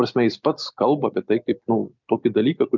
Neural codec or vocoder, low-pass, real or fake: none; 7.2 kHz; real